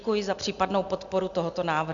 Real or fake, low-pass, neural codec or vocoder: real; 7.2 kHz; none